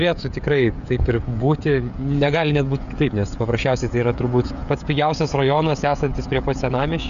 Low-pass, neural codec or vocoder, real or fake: 7.2 kHz; codec, 16 kHz, 16 kbps, FreqCodec, smaller model; fake